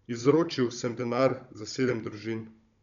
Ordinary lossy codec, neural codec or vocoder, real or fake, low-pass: MP3, 96 kbps; codec, 16 kHz, 16 kbps, FunCodec, trained on Chinese and English, 50 frames a second; fake; 7.2 kHz